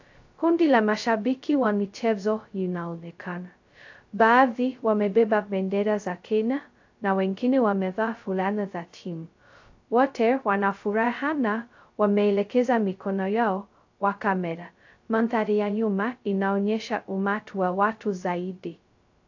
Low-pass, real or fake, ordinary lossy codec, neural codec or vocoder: 7.2 kHz; fake; AAC, 48 kbps; codec, 16 kHz, 0.2 kbps, FocalCodec